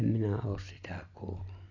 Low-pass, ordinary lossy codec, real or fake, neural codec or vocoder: 7.2 kHz; none; real; none